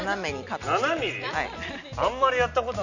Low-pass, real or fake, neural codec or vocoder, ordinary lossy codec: 7.2 kHz; real; none; none